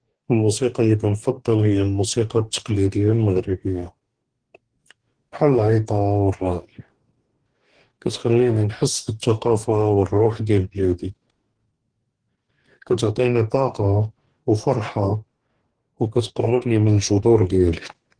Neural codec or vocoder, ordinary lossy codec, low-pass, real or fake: codec, 44.1 kHz, 2.6 kbps, DAC; Opus, 16 kbps; 9.9 kHz; fake